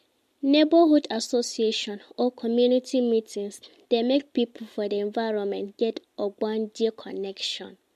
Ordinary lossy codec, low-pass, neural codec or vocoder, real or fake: MP3, 64 kbps; 14.4 kHz; none; real